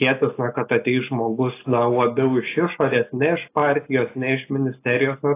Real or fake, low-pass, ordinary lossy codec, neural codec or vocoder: real; 3.6 kHz; AAC, 24 kbps; none